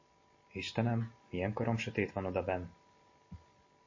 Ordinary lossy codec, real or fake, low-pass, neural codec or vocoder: MP3, 32 kbps; real; 7.2 kHz; none